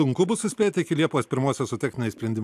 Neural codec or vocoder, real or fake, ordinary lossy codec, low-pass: none; real; AAC, 96 kbps; 14.4 kHz